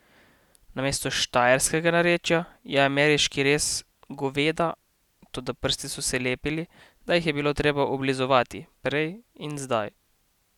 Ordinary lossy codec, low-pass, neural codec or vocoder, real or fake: none; 19.8 kHz; none; real